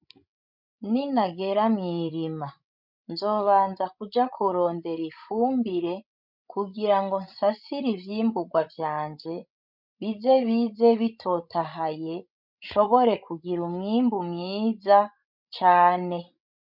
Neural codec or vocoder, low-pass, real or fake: codec, 16 kHz, 16 kbps, FreqCodec, larger model; 5.4 kHz; fake